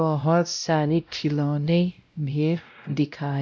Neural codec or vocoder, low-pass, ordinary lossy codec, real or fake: codec, 16 kHz, 0.5 kbps, X-Codec, WavLM features, trained on Multilingual LibriSpeech; none; none; fake